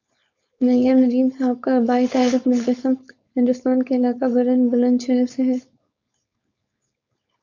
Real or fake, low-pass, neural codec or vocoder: fake; 7.2 kHz; codec, 16 kHz, 4.8 kbps, FACodec